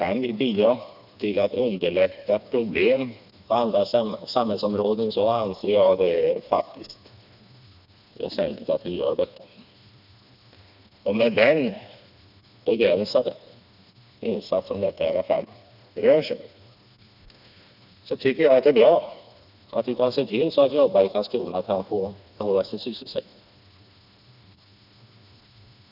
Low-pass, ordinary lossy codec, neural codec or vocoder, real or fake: 5.4 kHz; none; codec, 16 kHz, 2 kbps, FreqCodec, smaller model; fake